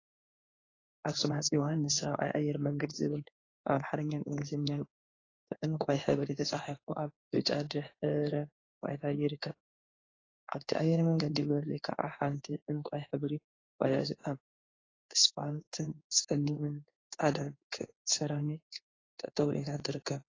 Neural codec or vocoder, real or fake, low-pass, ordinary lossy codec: codec, 16 kHz in and 24 kHz out, 1 kbps, XY-Tokenizer; fake; 7.2 kHz; AAC, 32 kbps